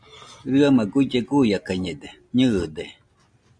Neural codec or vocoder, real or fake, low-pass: none; real; 9.9 kHz